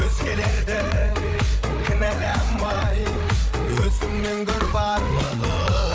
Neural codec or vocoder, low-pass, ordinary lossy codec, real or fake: codec, 16 kHz, 8 kbps, FreqCodec, larger model; none; none; fake